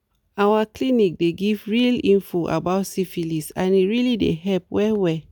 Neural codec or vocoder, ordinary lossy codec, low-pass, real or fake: none; none; none; real